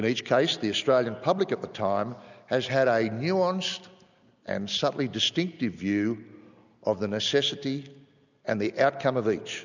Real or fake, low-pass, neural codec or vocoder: real; 7.2 kHz; none